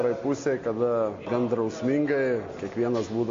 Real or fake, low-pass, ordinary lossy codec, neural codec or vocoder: real; 7.2 kHz; AAC, 48 kbps; none